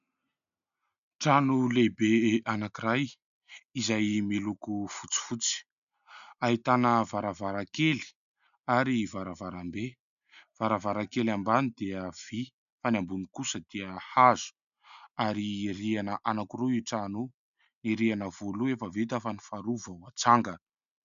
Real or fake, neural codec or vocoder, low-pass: real; none; 7.2 kHz